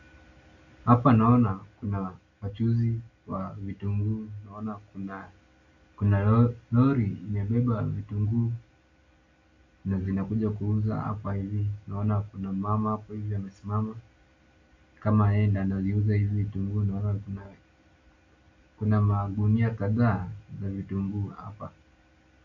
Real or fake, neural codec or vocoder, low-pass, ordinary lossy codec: real; none; 7.2 kHz; AAC, 48 kbps